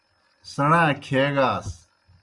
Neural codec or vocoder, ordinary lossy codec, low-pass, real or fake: none; Opus, 64 kbps; 10.8 kHz; real